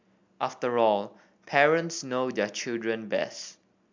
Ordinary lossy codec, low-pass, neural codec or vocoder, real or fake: MP3, 64 kbps; 7.2 kHz; none; real